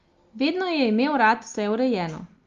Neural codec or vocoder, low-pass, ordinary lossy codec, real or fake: none; 7.2 kHz; Opus, 32 kbps; real